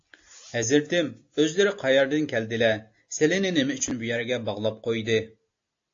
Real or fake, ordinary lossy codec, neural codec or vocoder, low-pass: real; AAC, 48 kbps; none; 7.2 kHz